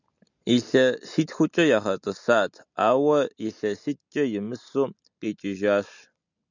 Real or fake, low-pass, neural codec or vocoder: real; 7.2 kHz; none